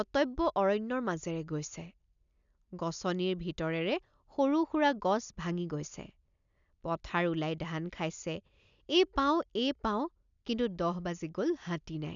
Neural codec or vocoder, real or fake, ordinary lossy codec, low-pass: none; real; none; 7.2 kHz